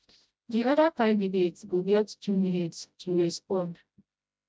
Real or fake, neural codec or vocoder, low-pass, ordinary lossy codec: fake; codec, 16 kHz, 0.5 kbps, FreqCodec, smaller model; none; none